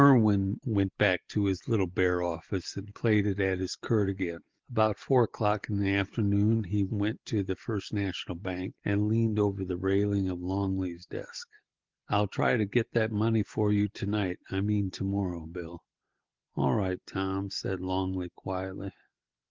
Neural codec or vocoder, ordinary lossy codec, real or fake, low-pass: none; Opus, 16 kbps; real; 7.2 kHz